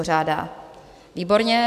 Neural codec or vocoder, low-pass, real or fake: none; 14.4 kHz; real